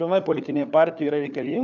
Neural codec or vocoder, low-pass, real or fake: codec, 16 kHz, 4 kbps, FunCodec, trained on LibriTTS, 50 frames a second; 7.2 kHz; fake